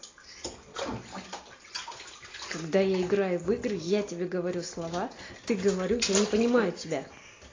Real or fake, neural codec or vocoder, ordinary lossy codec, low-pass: real; none; AAC, 32 kbps; 7.2 kHz